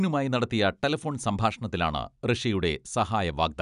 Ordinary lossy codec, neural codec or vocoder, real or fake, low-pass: none; none; real; 10.8 kHz